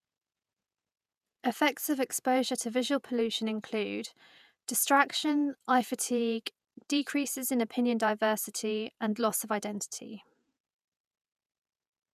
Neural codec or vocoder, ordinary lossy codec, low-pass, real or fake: vocoder, 48 kHz, 128 mel bands, Vocos; none; 14.4 kHz; fake